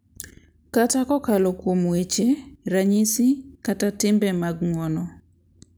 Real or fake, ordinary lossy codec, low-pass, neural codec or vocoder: real; none; none; none